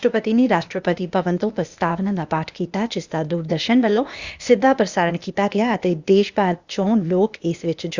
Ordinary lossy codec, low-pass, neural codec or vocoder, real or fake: Opus, 64 kbps; 7.2 kHz; codec, 16 kHz, 0.8 kbps, ZipCodec; fake